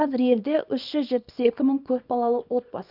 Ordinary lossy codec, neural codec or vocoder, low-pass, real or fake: none; codec, 24 kHz, 0.9 kbps, WavTokenizer, small release; 5.4 kHz; fake